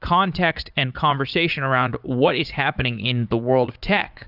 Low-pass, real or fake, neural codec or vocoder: 5.4 kHz; fake; vocoder, 44.1 kHz, 80 mel bands, Vocos